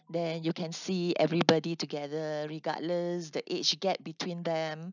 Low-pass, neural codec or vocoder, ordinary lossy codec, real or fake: 7.2 kHz; none; none; real